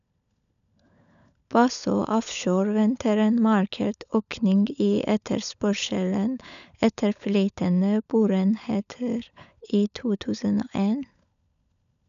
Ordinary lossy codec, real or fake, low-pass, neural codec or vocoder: none; fake; 7.2 kHz; codec, 16 kHz, 16 kbps, FunCodec, trained on LibriTTS, 50 frames a second